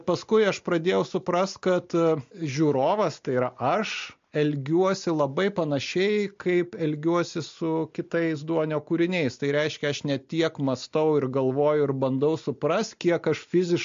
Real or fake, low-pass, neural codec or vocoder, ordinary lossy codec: real; 7.2 kHz; none; MP3, 48 kbps